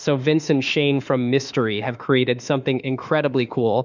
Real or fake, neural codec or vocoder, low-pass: fake; autoencoder, 48 kHz, 32 numbers a frame, DAC-VAE, trained on Japanese speech; 7.2 kHz